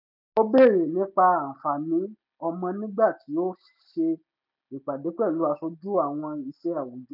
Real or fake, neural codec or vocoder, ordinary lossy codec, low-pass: real; none; none; 5.4 kHz